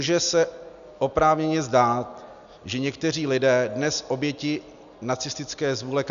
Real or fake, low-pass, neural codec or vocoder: real; 7.2 kHz; none